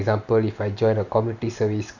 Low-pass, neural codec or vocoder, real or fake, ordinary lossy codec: 7.2 kHz; none; real; none